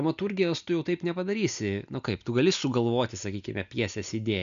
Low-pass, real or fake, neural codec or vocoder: 7.2 kHz; real; none